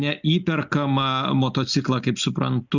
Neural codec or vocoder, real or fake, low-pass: none; real; 7.2 kHz